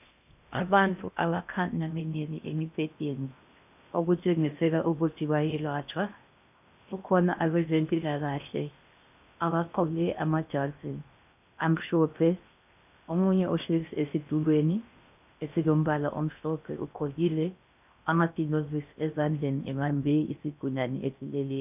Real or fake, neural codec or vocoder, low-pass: fake; codec, 16 kHz in and 24 kHz out, 0.6 kbps, FocalCodec, streaming, 2048 codes; 3.6 kHz